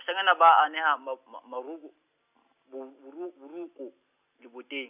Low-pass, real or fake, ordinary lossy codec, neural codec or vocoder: 3.6 kHz; real; none; none